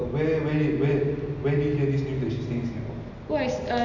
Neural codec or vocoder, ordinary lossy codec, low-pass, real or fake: none; none; 7.2 kHz; real